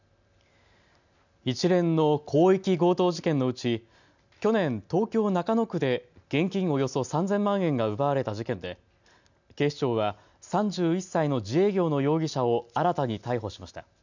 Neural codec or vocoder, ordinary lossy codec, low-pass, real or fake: none; none; 7.2 kHz; real